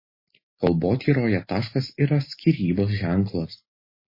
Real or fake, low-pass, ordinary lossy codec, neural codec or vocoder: real; 5.4 kHz; MP3, 24 kbps; none